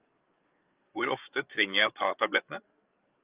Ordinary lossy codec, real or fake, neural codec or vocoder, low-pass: Opus, 24 kbps; fake; codec, 16 kHz, 8 kbps, FreqCodec, larger model; 3.6 kHz